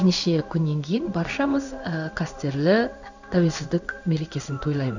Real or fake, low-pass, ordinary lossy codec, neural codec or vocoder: fake; 7.2 kHz; none; codec, 16 kHz in and 24 kHz out, 1 kbps, XY-Tokenizer